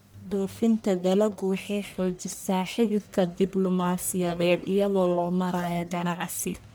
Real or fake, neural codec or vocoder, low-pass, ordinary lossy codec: fake; codec, 44.1 kHz, 1.7 kbps, Pupu-Codec; none; none